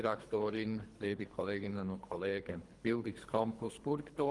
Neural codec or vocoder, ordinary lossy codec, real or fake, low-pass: codec, 24 kHz, 3 kbps, HILCodec; Opus, 24 kbps; fake; 10.8 kHz